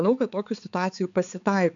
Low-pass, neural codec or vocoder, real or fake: 7.2 kHz; codec, 16 kHz, 4 kbps, X-Codec, HuBERT features, trained on balanced general audio; fake